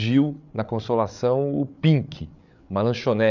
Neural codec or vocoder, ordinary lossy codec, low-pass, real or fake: codec, 16 kHz, 8 kbps, FreqCodec, larger model; none; 7.2 kHz; fake